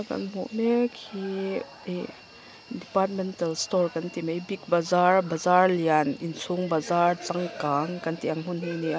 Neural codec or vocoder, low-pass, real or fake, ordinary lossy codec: none; none; real; none